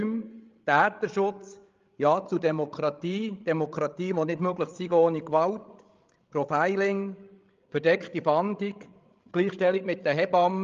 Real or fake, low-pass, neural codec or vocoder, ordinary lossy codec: fake; 7.2 kHz; codec, 16 kHz, 16 kbps, FreqCodec, larger model; Opus, 32 kbps